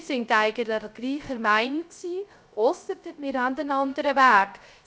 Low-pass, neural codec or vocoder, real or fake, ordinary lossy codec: none; codec, 16 kHz, 0.3 kbps, FocalCodec; fake; none